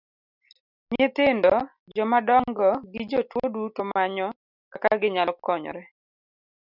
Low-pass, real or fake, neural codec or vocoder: 5.4 kHz; real; none